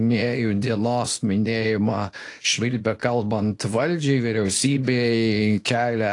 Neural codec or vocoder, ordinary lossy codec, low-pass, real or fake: codec, 24 kHz, 0.9 kbps, WavTokenizer, small release; AAC, 48 kbps; 10.8 kHz; fake